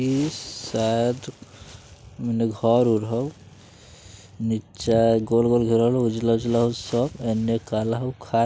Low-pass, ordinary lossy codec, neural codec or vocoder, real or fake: none; none; none; real